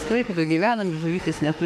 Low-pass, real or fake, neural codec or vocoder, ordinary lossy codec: 14.4 kHz; fake; autoencoder, 48 kHz, 32 numbers a frame, DAC-VAE, trained on Japanese speech; MP3, 96 kbps